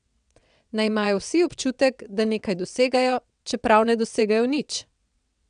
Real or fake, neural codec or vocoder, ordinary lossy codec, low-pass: fake; vocoder, 22.05 kHz, 80 mel bands, WaveNeXt; none; 9.9 kHz